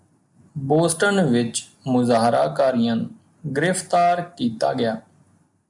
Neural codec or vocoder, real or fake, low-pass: none; real; 10.8 kHz